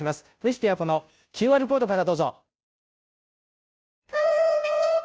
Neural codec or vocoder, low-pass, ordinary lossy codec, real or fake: codec, 16 kHz, 0.5 kbps, FunCodec, trained on Chinese and English, 25 frames a second; none; none; fake